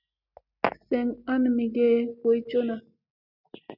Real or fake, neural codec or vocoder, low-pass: real; none; 5.4 kHz